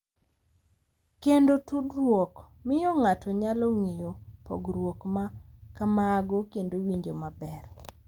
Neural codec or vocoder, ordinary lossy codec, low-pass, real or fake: none; Opus, 32 kbps; 19.8 kHz; real